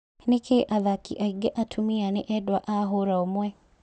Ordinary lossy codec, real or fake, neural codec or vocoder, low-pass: none; real; none; none